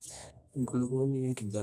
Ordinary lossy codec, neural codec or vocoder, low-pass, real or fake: none; codec, 24 kHz, 0.9 kbps, WavTokenizer, medium music audio release; none; fake